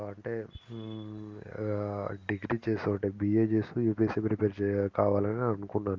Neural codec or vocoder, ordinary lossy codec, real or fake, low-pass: none; Opus, 32 kbps; real; 7.2 kHz